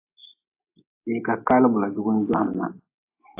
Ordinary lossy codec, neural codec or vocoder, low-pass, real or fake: AAC, 24 kbps; none; 3.6 kHz; real